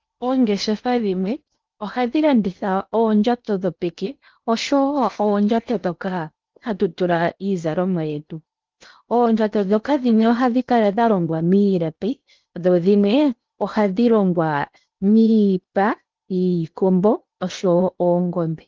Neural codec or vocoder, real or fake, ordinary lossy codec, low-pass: codec, 16 kHz in and 24 kHz out, 0.8 kbps, FocalCodec, streaming, 65536 codes; fake; Opus, 32 kbps; 7.2 kHz